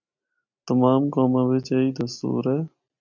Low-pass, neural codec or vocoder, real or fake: 7.2 kHz; none; real